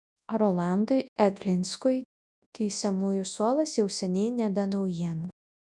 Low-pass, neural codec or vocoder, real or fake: 10.8 kHz; codec, 24 kHz, 0.9 kbps, WavTokenizer, large speech release; fake